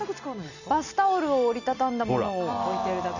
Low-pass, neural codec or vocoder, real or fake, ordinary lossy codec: 7.2 kHz; none; real; none